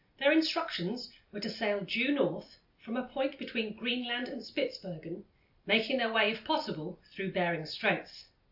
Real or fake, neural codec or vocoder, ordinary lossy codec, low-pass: real; none; AAC, 48 kbps; 5.4 kHz